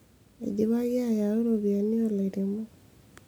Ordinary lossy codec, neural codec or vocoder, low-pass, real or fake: none; none; none; real